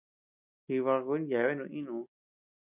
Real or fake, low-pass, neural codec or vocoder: real; 3.6 kHz; none